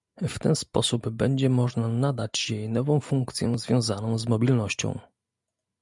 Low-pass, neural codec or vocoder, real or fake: 10.8 kHz; none; real